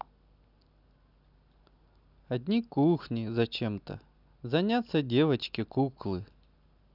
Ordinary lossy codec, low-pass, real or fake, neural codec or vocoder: none; 5.4 kHz; real; none